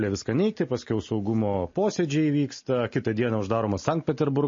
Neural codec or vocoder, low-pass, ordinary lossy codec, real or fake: none; 7.2 kHz; MP3, 32 kbps; real